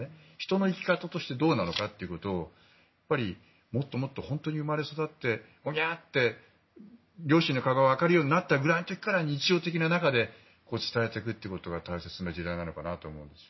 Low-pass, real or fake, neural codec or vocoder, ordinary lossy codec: 7.2 kHz; real; none; MP3, 24 kbps